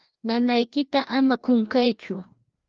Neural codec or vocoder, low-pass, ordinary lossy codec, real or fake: codec, 16 kHz, 1 kbps, FreqCodec, larger model; 7.2 kHz; Opus, 32 kbps; fake